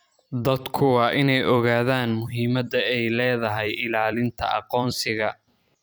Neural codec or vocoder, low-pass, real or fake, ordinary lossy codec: vocoder, 44.1 kHz, 128 mel bands every 256 samples, BigVGAN v2; none; fake; none